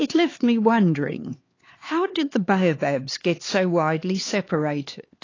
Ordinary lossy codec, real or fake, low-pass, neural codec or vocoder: AAC, 32 kbps; fake; 7.2 kHz; codec, 16 kHz, 4 kbps, X-Codec, HuBERT features, trained on LibriSpeech